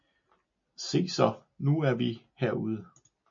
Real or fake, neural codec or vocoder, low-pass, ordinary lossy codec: real; none; 7.2 kHz; MP3, 48 kbps